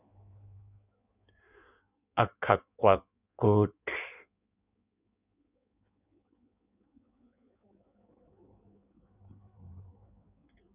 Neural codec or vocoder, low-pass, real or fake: codec, 16 kHz in and 24 kHz out, 1.1 kbps, FireRedTTS-2 codec; 3.6 kHz; fake